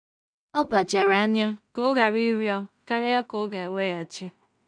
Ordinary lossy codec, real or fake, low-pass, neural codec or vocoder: none; fake; 9.9 kHz; codec, 16 kHz in and 24 kHz out, 0.4 kbps, LongCat-Audio-Codec, two codebook decoder